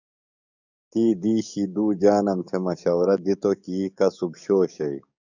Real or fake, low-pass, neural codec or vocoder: fake; 7.2 kHz; codec, 44.1 kHz, 7.8 kbps, DAC